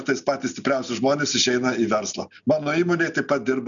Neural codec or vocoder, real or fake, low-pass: none; real; 7.2 kHz